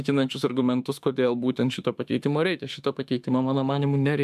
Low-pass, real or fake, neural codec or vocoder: 14.4 kHz; fake; autoencoder, 48 kHz, 32 numbers a frame, DAC-VAE, trained on Japanese speech